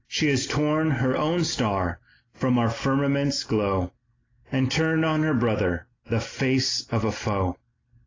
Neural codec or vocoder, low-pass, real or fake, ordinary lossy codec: none; 7.2 kHz; real; AAC, 32 kbps